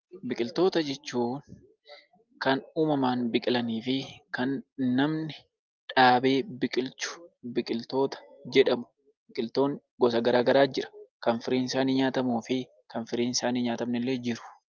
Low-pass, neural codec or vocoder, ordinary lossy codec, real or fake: 7.2 kHz; none; Opus, 24 kbps; real